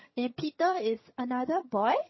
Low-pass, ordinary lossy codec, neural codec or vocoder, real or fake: 7.2 kHz; MP3, 24 kbps; vocoder, 22.05 kHz, 80 mel bands, HiFi-GAN; fake